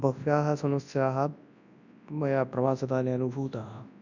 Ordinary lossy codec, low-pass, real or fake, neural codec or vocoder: none; 7.2 kHz; fake; codec, 24 kHz, 0.9 kbps, WavTokenizer, large speech release